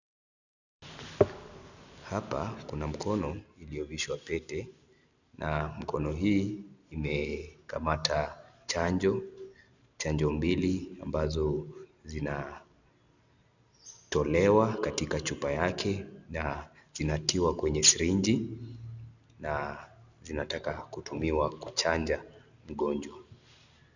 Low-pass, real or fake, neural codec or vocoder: 7.2 kHz; real; none